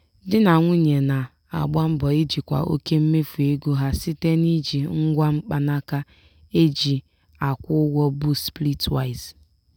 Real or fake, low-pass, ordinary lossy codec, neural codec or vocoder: real; 19.8 kHz; none; none